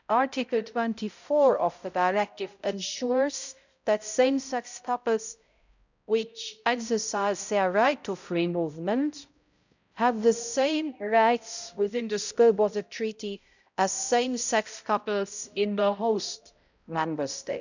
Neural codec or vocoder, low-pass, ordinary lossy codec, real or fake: codec, 16 kHz, 0.5 kbps, X-Codec, HuBERT features, trained on balanced general audio; 7.2 kHz; none; fake